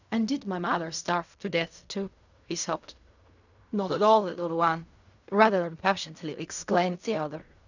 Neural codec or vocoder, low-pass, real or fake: codec, 16 kHz in and 24 kHz out, 0.4 kbps, LongCat-Audio-Codec, fine tuned four codebook decoder; 7.2 kHz; fake